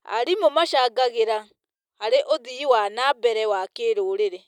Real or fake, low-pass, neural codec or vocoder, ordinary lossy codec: real; 19.8 kHz; none; none